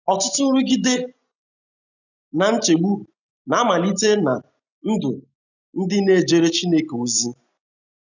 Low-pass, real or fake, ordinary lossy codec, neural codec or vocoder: 7.2 kHz; real; none; none